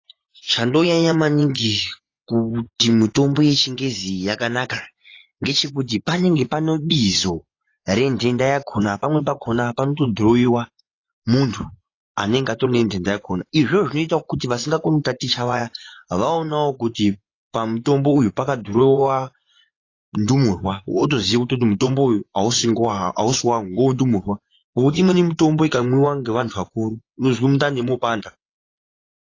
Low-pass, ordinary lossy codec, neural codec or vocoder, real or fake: 7.2 kHz; AAC, 32 kbps; vocoder, 24 kHz, 100 mel bands, Vocos; fake